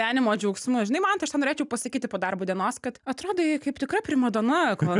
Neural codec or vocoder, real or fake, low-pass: none; real; 10.8 kHz